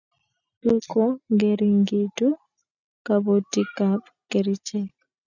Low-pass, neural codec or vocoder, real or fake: 7.2 kHz; none; real